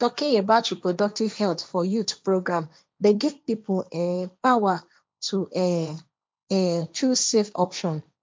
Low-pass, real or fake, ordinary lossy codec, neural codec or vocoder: none; fake; none; codec, 16 kHz, 1.1 kbps, Voila-Tokenizer